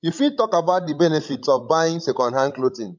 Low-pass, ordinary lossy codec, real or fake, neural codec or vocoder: 7.2 kHz; MP3, 48 kbps; fake; codec, 16 kHz, 16 kbps, FreqCodec, larger model